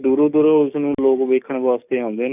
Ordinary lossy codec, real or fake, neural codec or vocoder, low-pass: none; fake; codec, 44.1 kHz, 7.8 kbps, DAC; 3.6 kHz